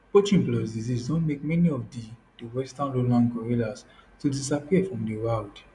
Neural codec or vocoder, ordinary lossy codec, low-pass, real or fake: none; none; 10.8 kHz; real